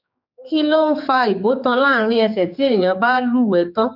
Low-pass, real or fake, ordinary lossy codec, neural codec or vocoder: 5.4 kHz; fake; none; codec, 16 kHz, 4 kbps, X-Codec, HuBERT features, trained on general audio